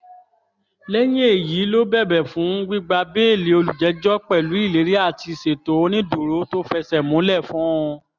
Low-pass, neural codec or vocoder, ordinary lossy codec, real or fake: 7.2 kHz; none; none; real